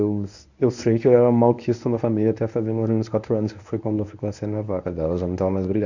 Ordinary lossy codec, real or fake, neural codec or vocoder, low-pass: none; fake; codec, 24 kHz, 0.9 kbps, WavTokenizer, medium speech release version 1; 7.2 kHz